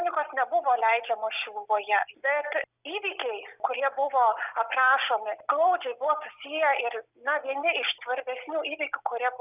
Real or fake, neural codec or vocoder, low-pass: real; none; 3.6 kHz